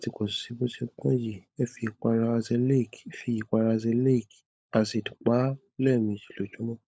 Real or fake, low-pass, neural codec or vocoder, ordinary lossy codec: fake; none; codec, 16 kHz, 16 kbps, FunCodec, trained on LibriTTS, 50 frames a second; none